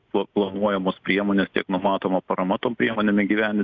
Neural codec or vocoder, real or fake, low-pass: none; real; 7.2 kHz